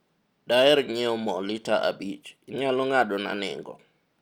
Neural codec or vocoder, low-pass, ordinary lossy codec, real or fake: none; 19.8 kHz; Opus, 64 kbps; real